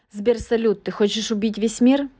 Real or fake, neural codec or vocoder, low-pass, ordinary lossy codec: real; none; none; none